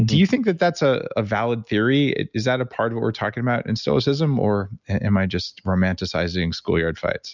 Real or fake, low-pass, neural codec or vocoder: real; 7.2 kHz; none